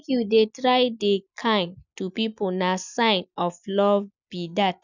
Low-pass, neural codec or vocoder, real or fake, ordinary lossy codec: 7.2 kHz; none; real; none